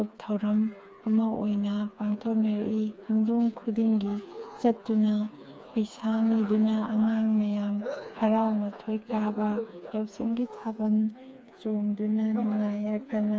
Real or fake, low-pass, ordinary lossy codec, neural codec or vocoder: fake; none; none; codec, 16 kHz, 4 kbps, FreqCodec, smaller model